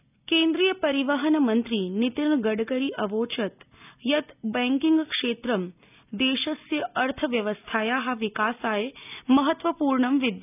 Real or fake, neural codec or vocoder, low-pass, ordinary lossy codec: real; none; 3.6 kHz; none